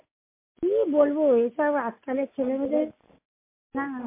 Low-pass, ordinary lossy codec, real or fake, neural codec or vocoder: 3.6 kHz; MP3, 24 kbps; real; none